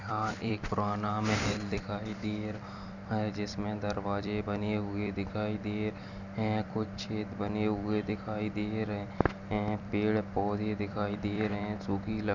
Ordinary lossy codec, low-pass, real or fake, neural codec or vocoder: none; 7.2 kHz; fake; vocoder, 44.1 kHz, 128 mel bands every 256 samples, BigVGAN v2